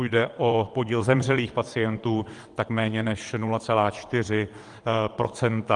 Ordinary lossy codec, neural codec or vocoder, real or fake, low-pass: Opus, 24 kbps; vocoder, 22.05 kHz, 80 mel bands, WaveNeXt; fake; 9.9 kHz